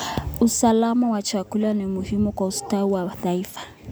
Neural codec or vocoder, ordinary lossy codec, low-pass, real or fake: none; none; none; real